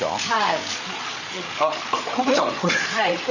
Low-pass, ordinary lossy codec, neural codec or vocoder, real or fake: 7.2 kHz; none; codec, 16 kHz, 8 kbps, FreqCodec, larger model; fake